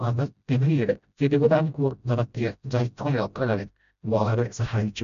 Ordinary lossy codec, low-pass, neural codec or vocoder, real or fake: none; 7.2 kHz; codec, 16 kHz, 0.5 kbps, FreqCodec, smaller model; fake